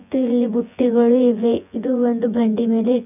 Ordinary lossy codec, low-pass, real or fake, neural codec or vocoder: none; 3.6 kHz; fake; vocoder, 24 kHz, 100 mel bands, Vocos